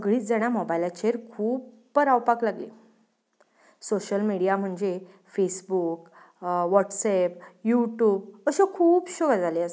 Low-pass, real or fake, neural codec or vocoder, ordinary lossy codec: none; real; none; none